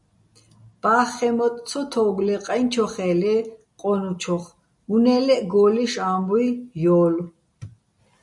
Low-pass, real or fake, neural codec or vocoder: 10.8 kHz; real; none